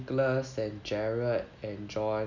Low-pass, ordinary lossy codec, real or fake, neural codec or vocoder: 7.2 kHz; none; real; none